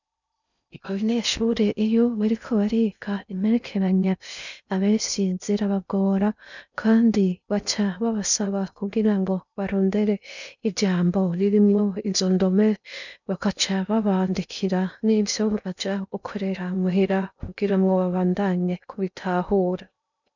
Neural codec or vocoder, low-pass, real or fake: codec, 16 kHz in and 24 kHz out, 0.6 kbps, FocalCodec, streaming, 2048 codes; 7.2 kHz; fake